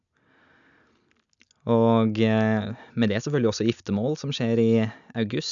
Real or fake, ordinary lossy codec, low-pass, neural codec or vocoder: real; none; 7.2 kHz; none